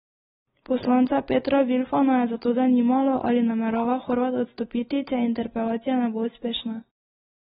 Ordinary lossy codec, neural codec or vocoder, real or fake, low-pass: AAC, 16 kbps; none; real; 7.2 kHz